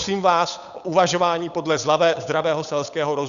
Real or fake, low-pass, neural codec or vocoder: fake; 7.2 kHz; codec, 16 kHz, 8 kbps, FunCodec, trained on Chinese and English, 25 frames a second